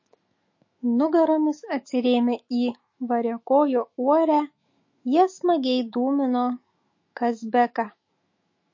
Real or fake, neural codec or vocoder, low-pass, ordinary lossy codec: fake; autoencoder, 48 kHz, 128 numbers a frame, DAC-VAE, trained on Japanese speech; 7.2 kHz; MP3, 32 kbps